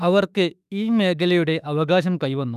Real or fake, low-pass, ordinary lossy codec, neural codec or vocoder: fake; 14.4 kHz; none; autoencoder, 48 kHz, 32 numbers a frame, DAC-VAE, trained on Japanese speech